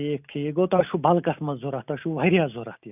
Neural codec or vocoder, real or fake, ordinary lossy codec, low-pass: none; real; none; 3.6 kHz